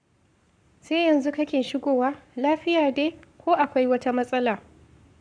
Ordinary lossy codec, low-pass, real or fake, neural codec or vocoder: none; 9.9 kHz; fake; codec, 44.1 kHz, 7.8 kbps, Pupu-Codec